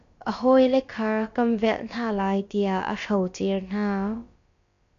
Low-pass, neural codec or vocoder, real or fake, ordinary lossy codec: 7.2 kHz; codec, 16 kHz, about 1 kbps, DyCAST, with the encoder's durations; fake; MP3, 48 kbps